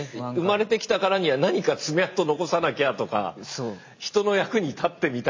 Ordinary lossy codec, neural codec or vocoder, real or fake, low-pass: MP3, 32 kbps; none; real; 7.2 kHz